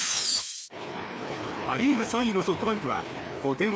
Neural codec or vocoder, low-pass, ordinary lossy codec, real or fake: codec, 16 kHz, 2 kbps, FreqCodec, larger model; none; none; fake